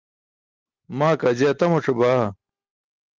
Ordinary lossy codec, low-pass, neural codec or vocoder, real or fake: Opus, 32 kbps; 7.2 kHz; none; real